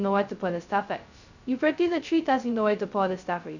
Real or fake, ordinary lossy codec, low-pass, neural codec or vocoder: fake; none; 7.2 kHz; codec, 16 kHz, 0.2 kbps, FocalCodec